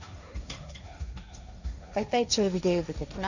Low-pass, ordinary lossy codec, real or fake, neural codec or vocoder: 7.2 kHz; none; fake; codec, 16 kHz, 1.1 kbps, Voila-Tokenizer